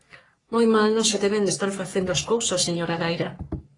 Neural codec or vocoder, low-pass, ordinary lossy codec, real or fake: codec, 44.1 kHz, 3.4 kbps, Pupu-Codec; 10.8 kHz; AAC, 32 kbps; fake